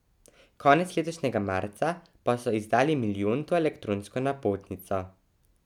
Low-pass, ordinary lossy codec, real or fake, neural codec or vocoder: 19.8 kHz; none; real; none